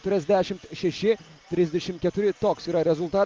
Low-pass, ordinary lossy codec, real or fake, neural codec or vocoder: 7.2 kHz; Opus, 24 kbps; real; none